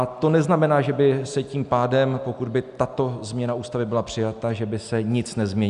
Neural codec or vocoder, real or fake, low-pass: none; real; 10.8 kHz